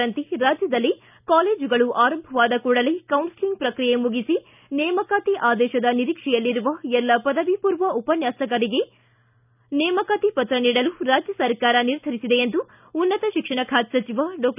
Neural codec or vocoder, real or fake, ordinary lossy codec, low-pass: none; real; none; 3.6 kHz